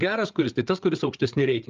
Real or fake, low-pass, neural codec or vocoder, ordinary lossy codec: fake; 7.2 kHz; codec, 16 kHz, 8 kbps, FreqCodec, larger model; Opus, 24 kbps